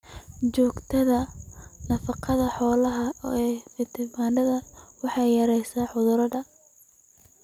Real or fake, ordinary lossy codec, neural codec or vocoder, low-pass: real; none; none; 19.8 kHz